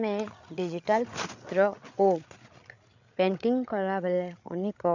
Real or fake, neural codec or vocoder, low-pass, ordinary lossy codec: fake; codec, 16 kHz, 8 kbps, FreqCodec, larger model; 7.2 kHz; none